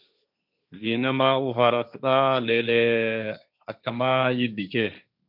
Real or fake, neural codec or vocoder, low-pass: fake; codec, 16 kHz, 1.1 kbps, Voila-Tokenizer; 5.4 kHz